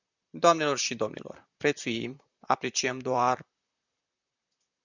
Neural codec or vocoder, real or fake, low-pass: vocoder, 44.1 kHz, 128 mel bands, Pupu-Vocoder; fake; 7.2 kHz